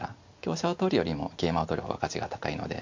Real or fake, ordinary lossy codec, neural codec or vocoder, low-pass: real; MP3, 48 kbps; none; 7.2 kHz